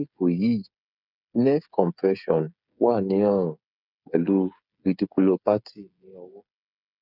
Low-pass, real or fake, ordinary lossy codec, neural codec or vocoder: 5.4 kHz; fake; none; codec, 16 kHz, 8 kbps, FreqCodec, smaller model